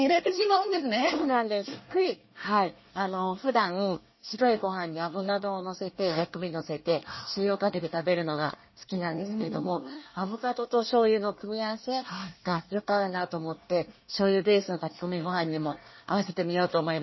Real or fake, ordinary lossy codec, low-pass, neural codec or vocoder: fake; MP3, 24 kbps; 7.2 kHz; codec, 24 kHz, 1 kbps, SNAC